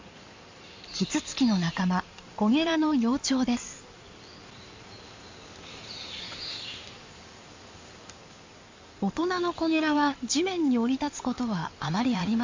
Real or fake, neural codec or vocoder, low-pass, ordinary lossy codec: fake; codec, 16 kHz in and 24 kHz out, 2.2 kbps, FireRedTTS-2 codec; 7.2 kHz; MP3, 48 kbps